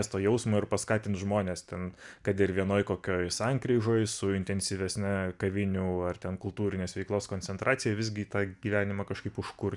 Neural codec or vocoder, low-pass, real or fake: none; 10.8 kHz; real